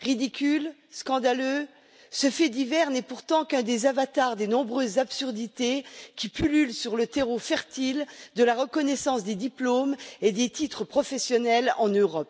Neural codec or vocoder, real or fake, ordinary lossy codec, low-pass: none; real; none; none